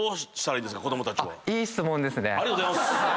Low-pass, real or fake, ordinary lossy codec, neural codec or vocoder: none; real; none; none